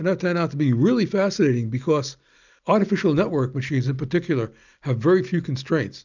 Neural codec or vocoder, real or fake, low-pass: none; real; 7.2 kHz